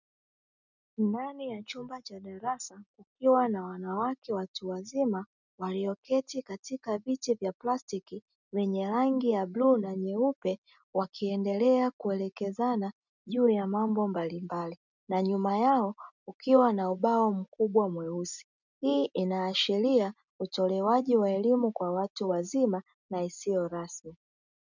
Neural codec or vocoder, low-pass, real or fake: none; 7.2 kHz; real